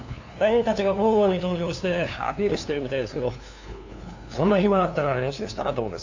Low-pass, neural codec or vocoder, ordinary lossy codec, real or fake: 7.2 kHz; codec, 16 kHz, 2 kbps, FunCodec, trained on LibriTTS, 25 frames a second; AAC, 48 kbps; fake